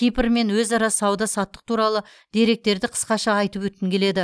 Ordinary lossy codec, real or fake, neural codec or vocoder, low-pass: none; real; none; none